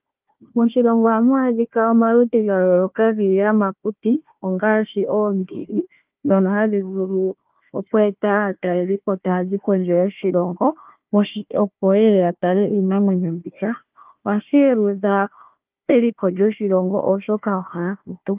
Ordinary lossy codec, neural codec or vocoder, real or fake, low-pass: Opus, 32 kbps; codec, 16 kHz, 1 kbps, FunCodec, trained on Chinese and English, 50 frames a second; fake; 3.6 kHz